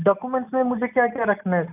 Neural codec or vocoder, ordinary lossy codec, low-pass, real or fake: none; none; 3.6 kHz; real